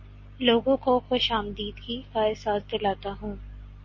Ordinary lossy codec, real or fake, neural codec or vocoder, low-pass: MP3, 32 kbps; real; none; 7.2 kHz